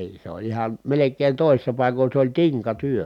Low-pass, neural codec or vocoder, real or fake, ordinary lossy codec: 19.8 kHz; none; real; none